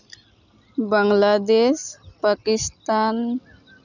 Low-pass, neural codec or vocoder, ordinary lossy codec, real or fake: 7.2 kHz; none; none; real